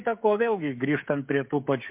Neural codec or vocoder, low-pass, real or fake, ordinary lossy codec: codec, 16 kHz, 16 kbps, FreqCodec, smaller model; 3.6 kHz; fake; MP3, 32 kbps